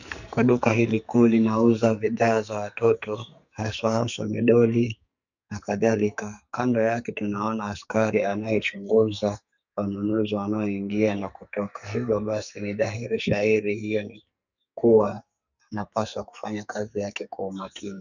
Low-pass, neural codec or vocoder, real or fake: 7.2 kHz; codec, 44.1 kHz, 2.6 kbps, SNAC; fake